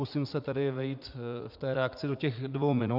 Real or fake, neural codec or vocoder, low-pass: fake; vocoder, 44.1 kHz, 80 mel bands, Vocos; 5.4 kHz